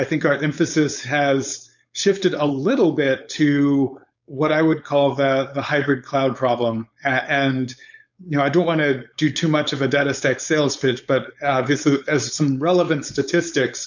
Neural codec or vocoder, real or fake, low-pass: codec, 16 kHz, 4.8 kbps, FACodec; fake; 7.2 kHz